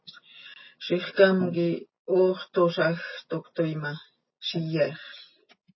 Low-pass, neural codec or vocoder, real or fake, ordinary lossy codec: 7.2 kHz; none; real; MP3, 24 kbps